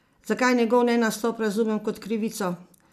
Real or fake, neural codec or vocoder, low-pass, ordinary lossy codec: real; none; 14.4 kHz; none